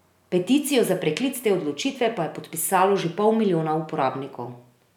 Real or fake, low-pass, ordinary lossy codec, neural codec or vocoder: real; 19.8 kHz; none; none